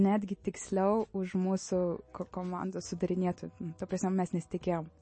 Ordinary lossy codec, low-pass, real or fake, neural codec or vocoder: MP3, 32 kbps; 9.9 kHz; real; none